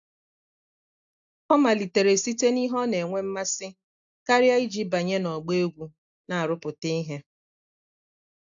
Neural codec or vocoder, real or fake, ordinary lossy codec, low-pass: none; real; AAC, 64 kbps; 7.2 kHz